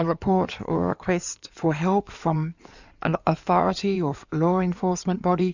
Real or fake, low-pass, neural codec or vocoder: fake; 7.2 kHz; codec, 16 kHz in and 24 kHz out, 2.2 kbps, FireRedTTS-2 codec